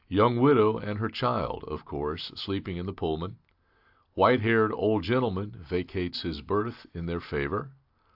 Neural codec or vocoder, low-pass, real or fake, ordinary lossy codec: none; 5.4 kHz; real; AAC, 48 kbps